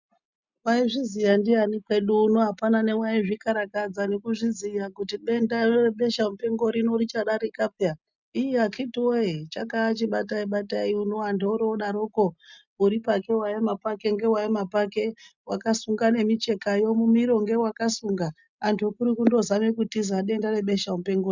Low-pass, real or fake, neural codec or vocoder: 7.2 kHz; real; none